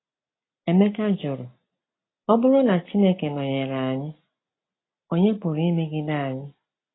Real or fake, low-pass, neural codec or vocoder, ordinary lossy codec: real; 7.2 kHz; none; AAC, 16 kbps